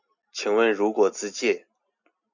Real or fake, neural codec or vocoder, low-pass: real; none; 7.2 kHz